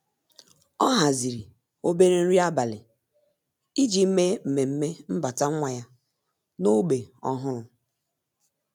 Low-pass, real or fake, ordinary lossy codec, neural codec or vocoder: none; real; none; none